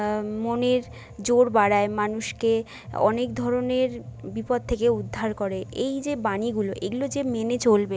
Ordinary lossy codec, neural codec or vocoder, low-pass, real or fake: none; none; none; real